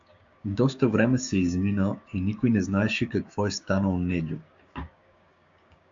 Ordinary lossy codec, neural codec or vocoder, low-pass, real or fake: MP3, 64 kbps; codec, 16 kHz, 6 kbps, DAC; 7.2 kHz; fake